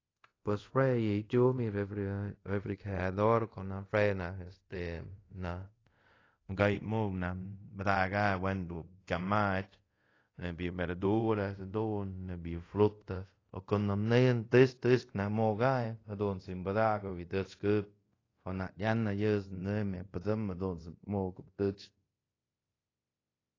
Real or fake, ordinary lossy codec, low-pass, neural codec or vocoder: fake; AAC, 32 kbps; 7.2 kHz; codec, 24 kHz, 0.5 kbps, DualCodec